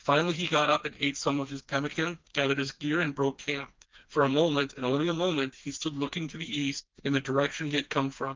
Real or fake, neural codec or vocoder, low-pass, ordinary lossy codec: fake; codec, 16 kHz, 2 kbps, FreqCodec, smaller model; 7.2 kHz; Opus, 24 kbps